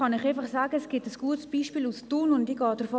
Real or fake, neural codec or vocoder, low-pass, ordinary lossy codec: real; none; none; none